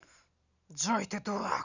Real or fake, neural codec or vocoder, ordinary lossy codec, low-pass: real; none; none; 7.2 kHz